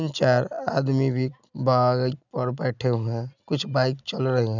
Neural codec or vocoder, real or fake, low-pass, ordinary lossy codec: none; real; 7.2 kHz; none